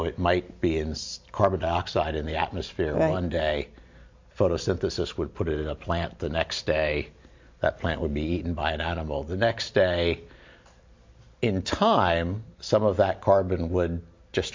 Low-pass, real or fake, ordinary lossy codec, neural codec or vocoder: 7.2 kHz; real; MP3, 48 kbps; none